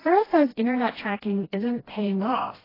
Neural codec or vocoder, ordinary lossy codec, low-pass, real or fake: codec, 16 kHz, 1 kbps, FreqCodec, smaller model; AAC, 24 kbps; 5.4 kHz; fake